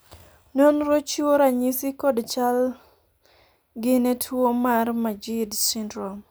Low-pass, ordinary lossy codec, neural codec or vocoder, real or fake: none; none; none; real